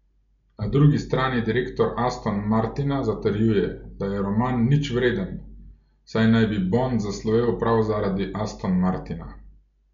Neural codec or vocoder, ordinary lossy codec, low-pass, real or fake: none; MP3, 64 kbps; 7.2 kHz; real